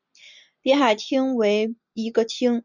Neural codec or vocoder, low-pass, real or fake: none; 7.2 kHz; real